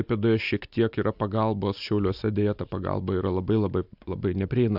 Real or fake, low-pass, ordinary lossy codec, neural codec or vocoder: real; 5.4 kHz; AAC, 48 kbps; none